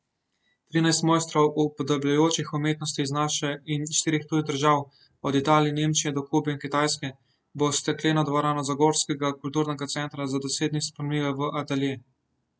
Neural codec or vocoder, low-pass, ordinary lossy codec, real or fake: none; none; none; real